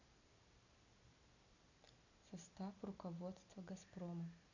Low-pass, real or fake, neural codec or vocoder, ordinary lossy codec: 7.2 kHz; real; none; none